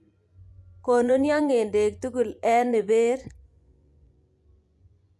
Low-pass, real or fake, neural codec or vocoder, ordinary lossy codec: none; fake; vocoder, 24 kHz, 100 mel bands, Vocos; none